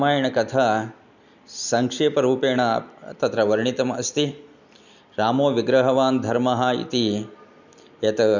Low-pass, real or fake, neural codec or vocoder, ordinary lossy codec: 7.2 kHz; real; none; none